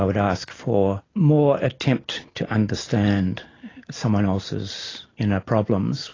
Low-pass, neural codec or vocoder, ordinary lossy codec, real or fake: 7.2 kHz; none; AAC, 32 kbps; real